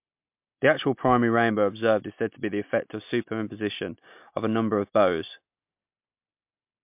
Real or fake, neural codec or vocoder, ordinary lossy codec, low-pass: real; none; MP3, 32 kbps; 3.6 kHz